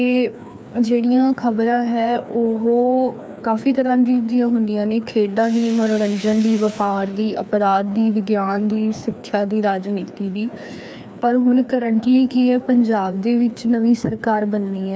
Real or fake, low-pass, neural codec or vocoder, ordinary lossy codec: fake; none; codec, 16 kHz, 2 kbps, FreqCodec, larger model; none